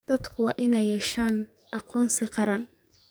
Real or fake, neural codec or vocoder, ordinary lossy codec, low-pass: fake; codec, 44.1 kHz, 2.6 kbps, SNAC; none; none